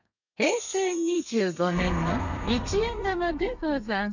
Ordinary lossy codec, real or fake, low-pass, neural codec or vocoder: none; fake; 7.2 kHz; codec, 32 kHz, 1.9 kbps, SNAC